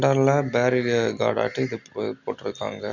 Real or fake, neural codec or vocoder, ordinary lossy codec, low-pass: real; none; none; 7.2 kHz